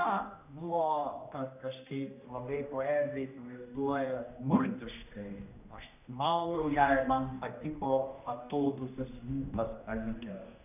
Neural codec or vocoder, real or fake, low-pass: codec, 16 kHz, 1 kbps, X-Codec, HuBERT features, trained on general audio; fake; 3.6 kHz